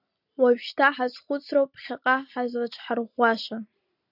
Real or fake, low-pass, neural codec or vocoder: real; 5.4 kHz; none